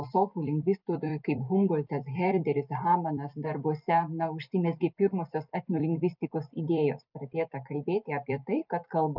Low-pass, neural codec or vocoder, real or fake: 5.4 kHz; vocoder, 44.1 kHz, 128 mel bands every 256 samples, BigVGAN v2; fake